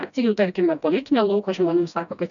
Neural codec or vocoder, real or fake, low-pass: codec, 16 kHz, 1 kbps, FreqCodec, smaller model; fake; 7.2 kHz